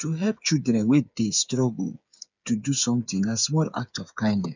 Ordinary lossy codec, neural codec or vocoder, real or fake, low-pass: none; codec, 16 kHz, 8 kbps, FreqCodec, smaller model; fake; 7.2 kHz